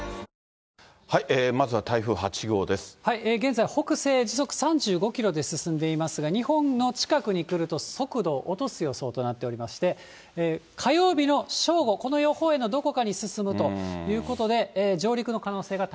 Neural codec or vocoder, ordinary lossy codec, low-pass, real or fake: none; none; none; real